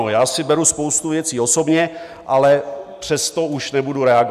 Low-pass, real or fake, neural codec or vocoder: 14.4 kHz; real; none